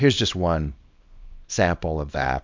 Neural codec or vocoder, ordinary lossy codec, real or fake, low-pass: codec, 24 kHz, 0.9 kbps, WavTokenizer, small release; MP3, 64 kbps; fake; 7.2 kHz